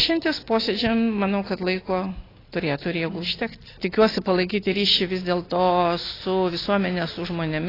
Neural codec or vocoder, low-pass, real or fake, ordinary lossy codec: none; 5.4 kHz; real; AAC, 24 kbps